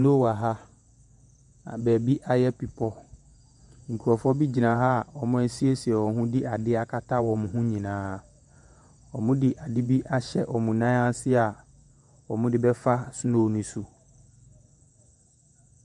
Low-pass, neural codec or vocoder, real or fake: 10.8 kHz; vocoder, 48 kHz, 128 mel bands, Vocos; fake